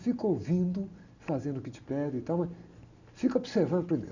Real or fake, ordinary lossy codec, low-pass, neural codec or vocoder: real; none; 7.2 kHz; none